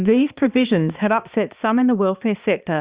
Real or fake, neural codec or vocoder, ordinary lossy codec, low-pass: fake; codec, 16 kHz, 2 kbps, X-Codec, HuBERT features, trained on balanced general audio; Opus, 64 kbps; 3.6 kHz